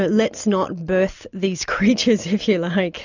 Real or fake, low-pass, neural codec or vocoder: real; 7.2 kHz; none